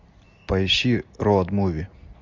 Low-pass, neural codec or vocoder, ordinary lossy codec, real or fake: 7.2 kHz; none; MP3, 64 kbps; real